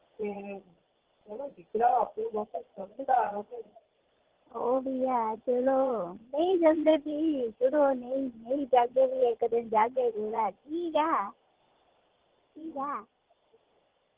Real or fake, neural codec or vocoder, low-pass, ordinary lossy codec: fake; vocoder, 22.05 kHz, 80 mel bands, Vocos; 3.6 kHz; Opus, 16 kbps